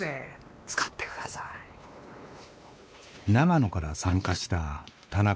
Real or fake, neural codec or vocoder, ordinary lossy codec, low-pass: fake; codec, 16 kHz, 2 kbps, X-Codec, WavLM features, trained on Multilingual LibriSpeech; none; none